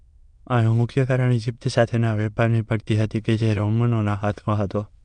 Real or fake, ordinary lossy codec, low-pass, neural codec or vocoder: fake; MP3, 96 kbps; 9.9 kHz; autoencoder, 22.05 kHz, a latent of 192 numbers a frame, VITS, trained on many speakers